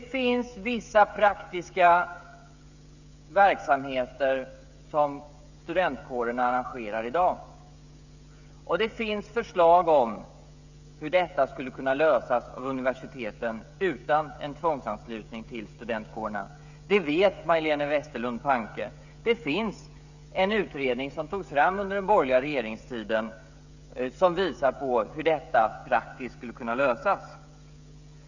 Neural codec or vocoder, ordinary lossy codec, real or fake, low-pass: codec, 16 kHz, 16 kbps, FreqCodec, smaller model; none; fake; 7.2 kHz